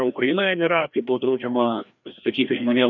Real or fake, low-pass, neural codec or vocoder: fake; 7.2 kHz; codec, 16 kHz, 1 kbps, FreqCodec, larger model